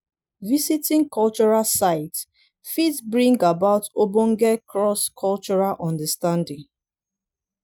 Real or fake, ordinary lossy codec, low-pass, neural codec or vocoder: real; none; none; none